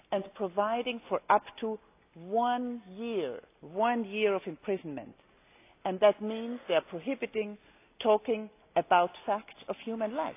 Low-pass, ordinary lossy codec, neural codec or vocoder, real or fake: 3.6 kHz; AAC, 24 kbps; none; real